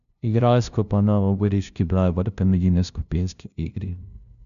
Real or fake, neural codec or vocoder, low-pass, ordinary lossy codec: fake; codec, 16 kHz, 0.5 kbps, FunCodec, trained on LibriTTS, 25 frames a second; 7.2 kHz; none